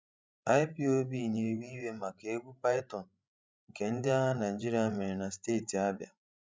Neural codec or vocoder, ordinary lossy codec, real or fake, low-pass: codec, 16 kHz, 16 kbps, FreqCodec, larger model; none; fake; none